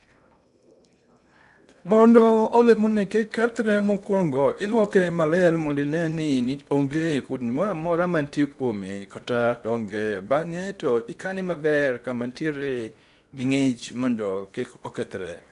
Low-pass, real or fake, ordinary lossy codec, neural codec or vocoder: 10.8 kHz; fake; none; codec, 16 kHz in and 24 kHz out, 0.8 kbps, FocalCodec, streaming, 65536 codes